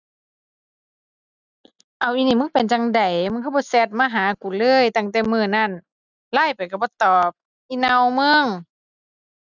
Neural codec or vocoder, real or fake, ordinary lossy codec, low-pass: none; real; none; 7.2 kHz